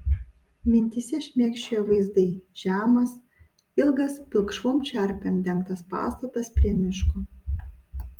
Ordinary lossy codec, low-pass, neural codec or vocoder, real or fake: Opus, 32 kbps; 19.8 kHz; vocoder, 44.1 kHz, 128 mel bands every 256 samples, BigVGAN v2; fake